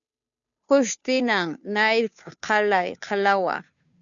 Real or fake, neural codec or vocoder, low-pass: fake; codec, 16 kHz, 2 kbps, FunCodec, trained on Chinese and English, 25 frames a second; 7.2 kHz